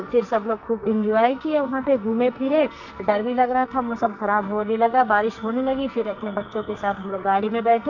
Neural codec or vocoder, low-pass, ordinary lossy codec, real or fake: codec, 44.1 kHz, 2.6 kbps, SNAC; 7.2 kHz; none; fake